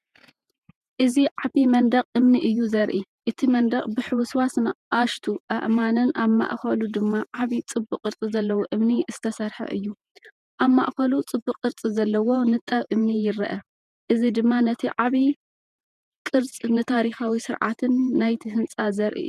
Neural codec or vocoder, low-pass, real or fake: vocoder, 48 kHz, 128 mel bands, Vocos; 14.4 kHz; fake